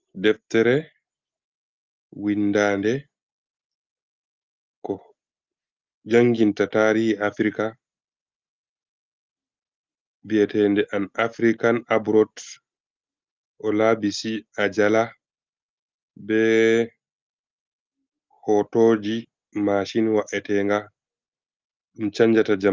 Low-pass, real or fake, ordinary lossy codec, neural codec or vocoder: 7.2 kHz; real; Opus, 32 kbps; none